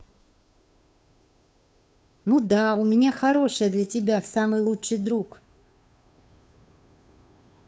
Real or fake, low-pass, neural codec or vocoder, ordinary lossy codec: fake; none; codec, 16 kHz, 2 kbps, FunCodec, trained on Chinese and English, 25 frames a second; none